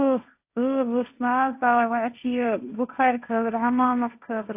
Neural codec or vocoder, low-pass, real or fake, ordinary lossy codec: codec, 16 kHz, 1.1 kbps, Voila-Tokenizer; 3.6 kHz; fake; AAC, 32 kbps